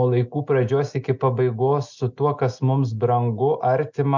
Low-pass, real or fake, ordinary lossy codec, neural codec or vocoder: 7.2 kHz; real; MP3, 64 kbps; none